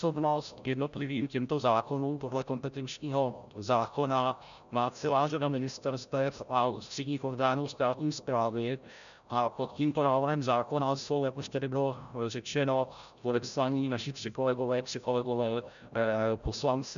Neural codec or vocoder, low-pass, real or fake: codec, 16 kHz, 0.5 kbps, FreqCodec, larger model; 7.2 kHz; fake